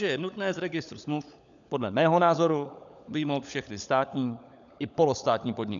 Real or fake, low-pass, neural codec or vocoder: fake; 7.2 kHz; codec, 16 kHz, 16 kbps, FunCodec, trained on LibriTTS, 50 frames a second